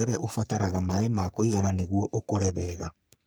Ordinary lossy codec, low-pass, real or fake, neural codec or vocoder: none; none; fake; codec, 44.1 kHz, 3.4 kbps, Pupu-Codec